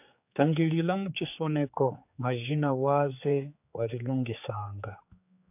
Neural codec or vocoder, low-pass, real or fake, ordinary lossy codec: codec, 16 kHz, 4 kbps, X-Codec, HuBERT features, trained on general audio; 3.6 kHz; fake; AAC, 32 kbps